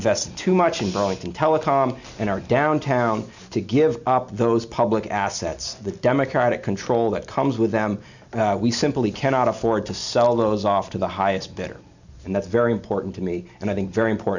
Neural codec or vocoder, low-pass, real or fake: none; 7.2 kHz; real